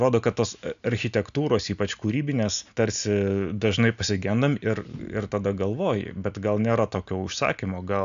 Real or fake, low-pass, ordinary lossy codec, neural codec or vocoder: real; 7.2 kHz; AAC, 96 kbps; none